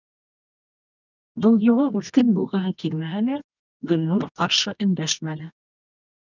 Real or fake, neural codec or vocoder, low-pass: fake; codec, 24 kHz, 0.9 kbps, WavTokenizer, medium music audio release; 7.2 kHz